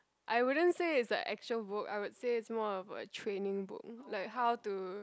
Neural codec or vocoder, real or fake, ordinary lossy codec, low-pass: none; real; none; none